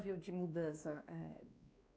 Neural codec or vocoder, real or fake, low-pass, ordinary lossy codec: codec, 16 kHz, 2 kbps, X-Codec, WavLM features, trained on Multilingual LibriSpeech; fake; none; none